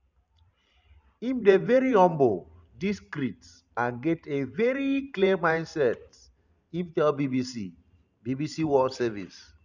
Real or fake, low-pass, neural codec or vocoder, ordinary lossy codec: fake; 7.2 kHz; vocoder, 44.1 kHz, 128 mel bands every 512 samples, BigVGAN v2; none